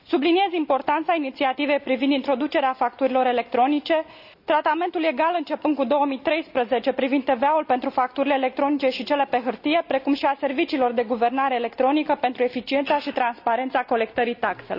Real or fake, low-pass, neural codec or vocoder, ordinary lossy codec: real; 5.4 kHz; none; none